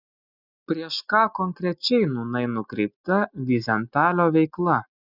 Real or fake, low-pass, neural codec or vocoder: real; 5.4 kHz; none